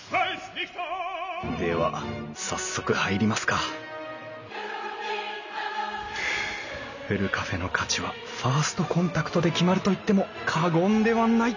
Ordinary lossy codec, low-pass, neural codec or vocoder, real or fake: none; 7.2 kHz; none; real